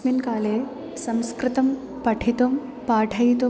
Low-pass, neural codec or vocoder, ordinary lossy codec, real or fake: none; none; none; real